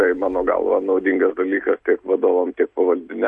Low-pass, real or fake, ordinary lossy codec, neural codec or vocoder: 10.8 kHz; real; MP3, 48 kbps; none